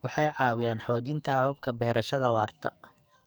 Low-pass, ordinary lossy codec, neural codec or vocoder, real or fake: none; none; codec, 44.1 kHz, 2.6 kbps, SNAC; fake